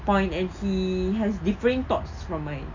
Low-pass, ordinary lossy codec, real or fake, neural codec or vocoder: 7.2 kHz; none; real; none